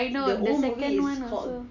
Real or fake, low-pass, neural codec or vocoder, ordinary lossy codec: real; 7.2 kHz; none; none